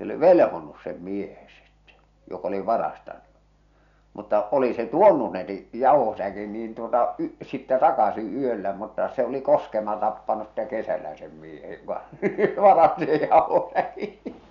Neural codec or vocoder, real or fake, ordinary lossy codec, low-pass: none; real; none; 7.2 kHz